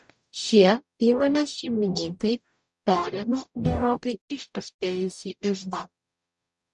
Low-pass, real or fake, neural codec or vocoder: 10.8 kHz; fake; codec, 44.1 kHz, 0.9 kbps, DAC